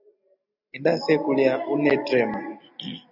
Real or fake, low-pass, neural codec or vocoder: real; 5.4 kHz; none